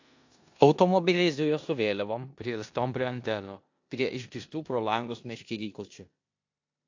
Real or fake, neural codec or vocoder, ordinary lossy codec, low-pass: fake; codec, 16 kHz in and 24 kHz out, 0.9 kbps, LongCat-Audio-Codec, four codebook decoder; AAC, 48 kbps; 7.2 kHz